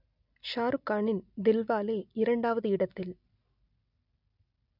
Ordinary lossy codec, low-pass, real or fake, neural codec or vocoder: none; 5.4 kHz; real; none